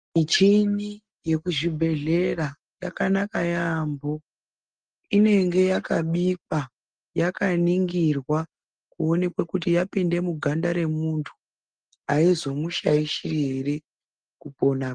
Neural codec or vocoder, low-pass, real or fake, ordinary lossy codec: none; 9.9 kHz; real; Opus, 24 kbps